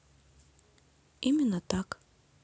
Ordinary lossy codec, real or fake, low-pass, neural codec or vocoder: none; real; none; none